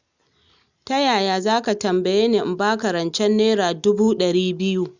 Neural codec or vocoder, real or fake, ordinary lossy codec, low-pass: none; real; none; 7.2 kHz